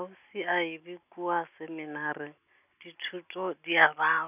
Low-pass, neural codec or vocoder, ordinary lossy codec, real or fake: 3.6 kHz; none; none; real